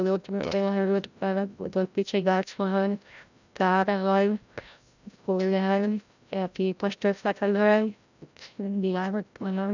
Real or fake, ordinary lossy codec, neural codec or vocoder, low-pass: fake; none; codec, 16 kHz, 0.5 kbps, FreqCodec, larger model; 7.2 kHz